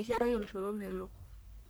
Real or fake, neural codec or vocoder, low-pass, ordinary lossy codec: fake; codec, 44.1 kHz, 1.7 kbps, Pupu-Codec; none; none